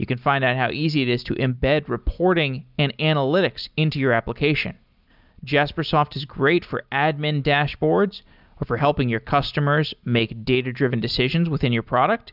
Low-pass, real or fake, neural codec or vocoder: 5.4 kHz; real; none